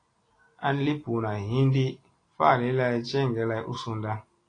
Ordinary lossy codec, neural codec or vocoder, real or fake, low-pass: AAC, 32 kbps; none; real; 9.9 kHz